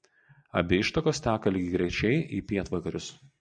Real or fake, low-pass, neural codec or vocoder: real; 9.9 kHz; none